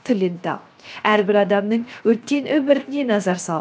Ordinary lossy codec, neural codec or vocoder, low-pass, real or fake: none; codec, 16 kHz, 0.7 kbps, FocalCodec; none; fake